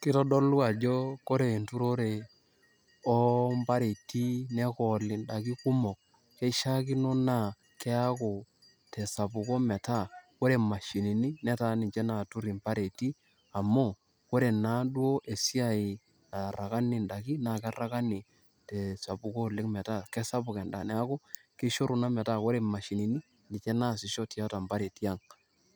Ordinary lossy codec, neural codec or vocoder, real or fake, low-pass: none; none; real; none